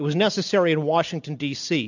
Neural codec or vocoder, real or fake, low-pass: none; real; 7.2 kHz